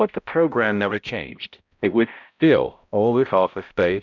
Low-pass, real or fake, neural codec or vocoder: 7.2 kHz; fake; codec, 16 kHz, 0.5 kbps, X-Codec, HuBERT features, trained on balanced general audio